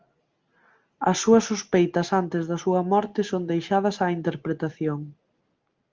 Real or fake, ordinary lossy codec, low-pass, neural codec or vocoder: real; Opus, 32 kbps; 7.2 kHz; none